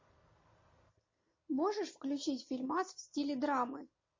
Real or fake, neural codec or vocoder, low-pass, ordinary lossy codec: real; none; 7.2 kHz; MP3, 32 kbps